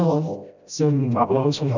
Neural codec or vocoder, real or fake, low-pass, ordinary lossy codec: codec, 16 kHz, 0.5 kbps, FreqCodec, smaller model; fake; 7.2 kHz; none